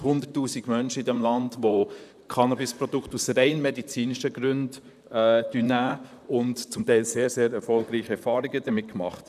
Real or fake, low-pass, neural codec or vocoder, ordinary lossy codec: fake; 14.4 kHz; vocoder, 44.1 kHz, 128 mel bands, Pupu-Vocoder; none